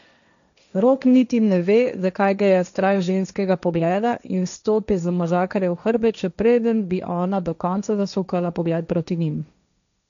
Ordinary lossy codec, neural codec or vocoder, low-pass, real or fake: none; codec, 16 kHz, 1.1 kbps, Voila-Tokenizer; 7.2 kHz; fake